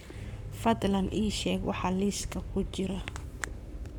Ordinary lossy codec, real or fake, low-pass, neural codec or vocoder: none; fake; 19.8 kHz; codec, 44.1 kHz, 7.8 kbps, Pupu-Codec